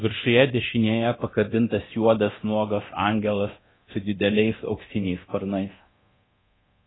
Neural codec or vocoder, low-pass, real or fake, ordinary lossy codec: codec, 24 kHz, 0.9 kbps, DualCodec; 7.2 kHz; fake; AAC, 16 kbps